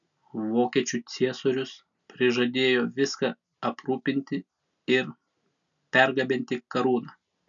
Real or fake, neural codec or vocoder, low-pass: real; none; 7.2 kHz